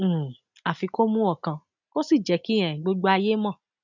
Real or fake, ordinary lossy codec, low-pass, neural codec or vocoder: real; none; 7.2 kHz; none